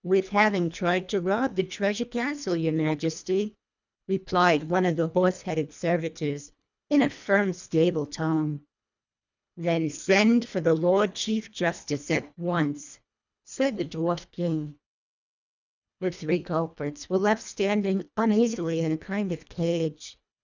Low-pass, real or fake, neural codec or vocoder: 7.2 kHz; fake; codec, 24 kHz, 1.5 kbps, HILCodec